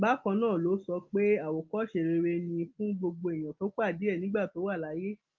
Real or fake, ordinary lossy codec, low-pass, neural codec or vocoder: real; Opus, 32 kbps; 7.2 kHz; none